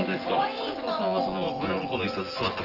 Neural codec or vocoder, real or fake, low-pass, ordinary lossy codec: vocoder, 24 kHz, 100 mel bands, Vocos; fake; 5.4 kHz; Opus, 16 kbps